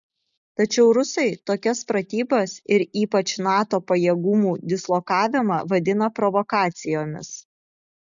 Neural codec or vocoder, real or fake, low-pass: none; real; 7.2 kHz